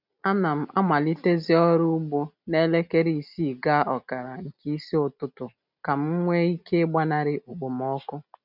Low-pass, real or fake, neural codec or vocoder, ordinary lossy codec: 5.4 kHz; real; none; none